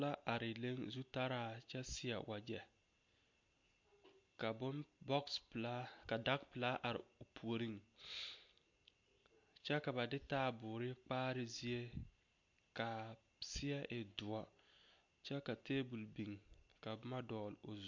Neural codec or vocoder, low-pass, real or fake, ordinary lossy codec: none; 7.2 kHz; real; MP3, 48 kbps